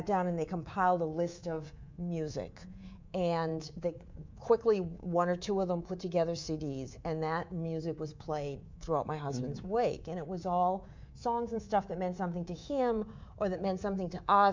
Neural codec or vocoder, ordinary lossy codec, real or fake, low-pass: codec, 24 kHz, 3.1 kbps, DualCodec; MP3, 64 kbps; fake; 7.2 kHz